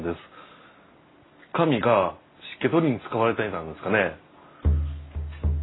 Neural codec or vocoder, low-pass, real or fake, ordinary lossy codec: none; 7.2 kHz; real; AAC, 16 kbps